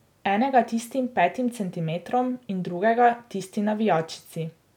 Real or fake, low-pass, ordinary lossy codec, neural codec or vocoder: real; 19.8 kHz; none; none